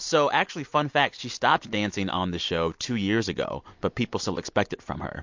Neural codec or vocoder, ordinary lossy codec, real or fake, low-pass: none; MP3, 48 kbps; real; 7.2 kHz